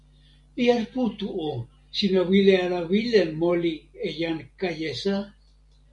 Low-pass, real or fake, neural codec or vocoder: 10.8 kHz; real; none